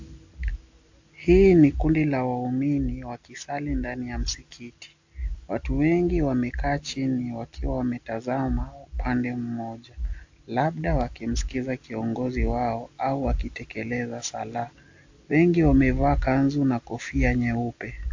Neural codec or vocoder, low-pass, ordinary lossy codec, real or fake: none; 7.2 kHz; AAC, 48 kbps; real